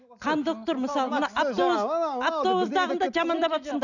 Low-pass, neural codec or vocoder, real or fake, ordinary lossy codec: 7.2 kHz; none; real; none